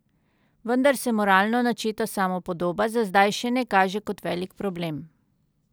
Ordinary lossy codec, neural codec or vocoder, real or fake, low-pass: none; none; real; none